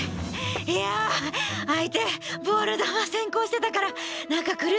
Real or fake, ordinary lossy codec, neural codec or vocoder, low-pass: real; none; none; none